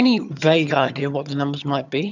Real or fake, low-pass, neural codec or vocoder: fake; 7.2 kHz; vocoder, 22.05 kHz, 80 mel bands, HiFi-GAN